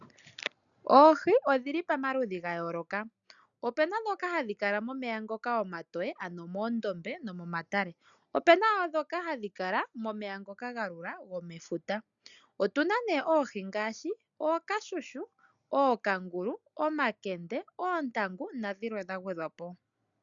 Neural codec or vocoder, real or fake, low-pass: none; real; 7.2 kHz